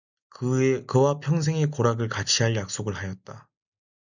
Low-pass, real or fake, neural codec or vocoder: 7.2 kHz; real; none